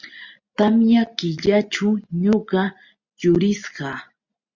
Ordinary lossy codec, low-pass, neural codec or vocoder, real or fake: Opus, 64 kbps; 7.2 kHz; none; real